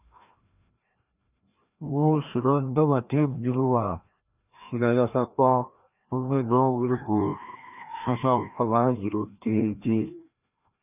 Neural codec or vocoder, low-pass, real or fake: codec, 16 kHz, 1 kbps, FreqCodec, larger model; 3.6 kHz; fake